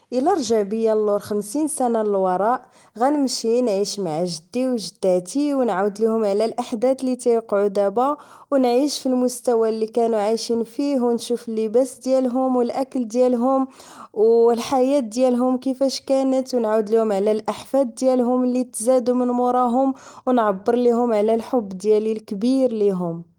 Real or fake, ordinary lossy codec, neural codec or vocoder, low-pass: real; Opus, 24 kbps; none; 19.8 kHz